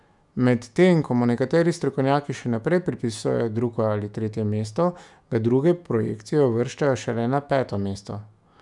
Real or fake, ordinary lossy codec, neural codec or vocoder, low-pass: fake; none; autoencoder, 48 kHz, 128 numbers a frame, DAC-VAE, trained on Japanese speech; 10.8 kHz